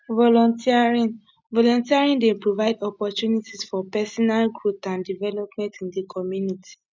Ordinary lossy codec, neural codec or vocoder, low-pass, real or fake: none; none; none; real